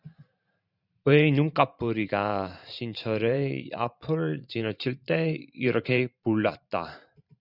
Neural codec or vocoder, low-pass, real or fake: vocoder, 44.1 kHz, 128 mel bands every 512 samples, BigVGAN v2; 5.4 kHz; fake